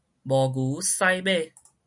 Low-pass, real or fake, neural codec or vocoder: 10.8 kHz; real; none